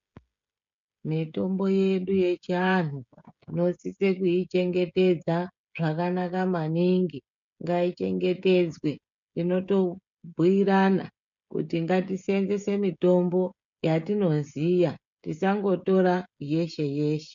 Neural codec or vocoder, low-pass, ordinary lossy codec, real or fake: codec, 16 kHz, 16 kbps, FreqCodec, smaller model; 7.2 kHz; MP3, 64 kbps; fake